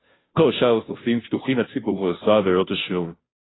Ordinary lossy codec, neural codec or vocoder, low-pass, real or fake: AAC, 16 kbps; codec, 16 kHz, 0.5 kbps, FunCodec, trained on Chinese and English, 25 frames a second; 7.2 kHz; fake